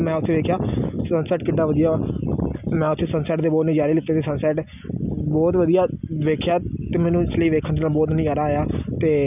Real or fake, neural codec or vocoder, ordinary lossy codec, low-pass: real; none; none; 3.6 kHz